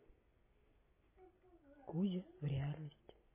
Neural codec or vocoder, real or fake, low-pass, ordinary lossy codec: none; real; 3.6 kHz; MP3, 16 kbps